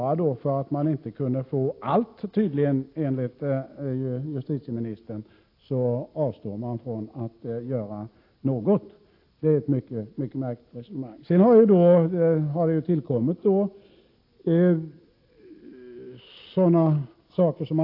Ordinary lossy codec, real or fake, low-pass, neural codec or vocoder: AAC, 32 kbps; real; 5.4 kHz; none